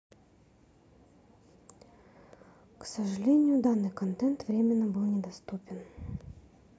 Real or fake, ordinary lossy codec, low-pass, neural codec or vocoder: real; none; none; none